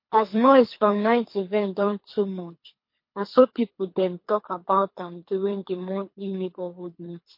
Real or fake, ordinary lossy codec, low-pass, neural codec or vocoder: fake; MP3, 32 kbps; 5.4 kHz; codec, 24 kHz, 3 kbps, HILCodec